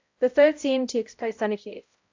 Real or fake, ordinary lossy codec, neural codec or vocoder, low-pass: fake; AAC, 48 kbps; codec, 16 kHz, 0.5 kbps, X-Codec, HuBERT features, trained on balanced general audio; 7.2 kHz